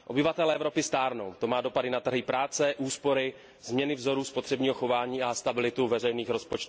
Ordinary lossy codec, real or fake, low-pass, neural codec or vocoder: none; real; none; none